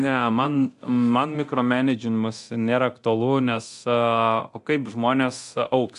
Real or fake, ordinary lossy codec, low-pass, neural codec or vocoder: fake; AAC, 64 kbps; 10.8 kHz; codec, 24 kHz, 0.9 kbps, DualCodec